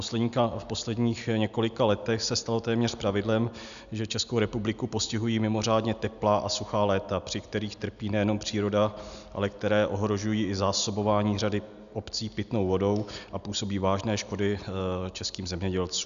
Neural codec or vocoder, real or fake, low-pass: none; real; 7.2 kHz